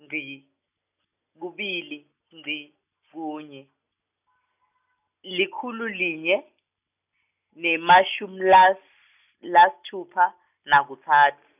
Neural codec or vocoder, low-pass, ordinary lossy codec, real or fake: none; 3.6 kHz; none; real